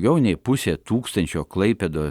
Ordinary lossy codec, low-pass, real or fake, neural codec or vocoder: Opus, 64 kbps; 19.8 kHz; real; none